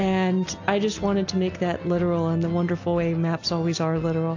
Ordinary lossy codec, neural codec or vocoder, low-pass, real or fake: AAC, 48 kbps; none; 7.2 kHz; real